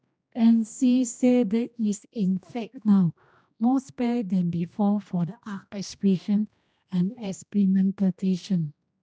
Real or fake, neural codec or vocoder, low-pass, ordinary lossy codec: fake; codec, 16 kHz, 1 kbps, X-Codec, HuBERT features, trained on general audio; none; none